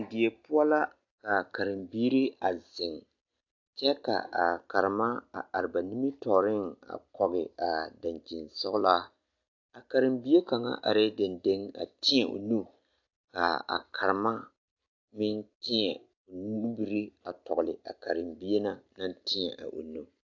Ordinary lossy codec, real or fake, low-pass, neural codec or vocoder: AAC, 48 kbps; real; 7.2 kHz; none